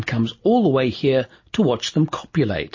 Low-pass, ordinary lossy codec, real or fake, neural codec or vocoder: 7.2 kHz; MP3, 32 kbps; real; none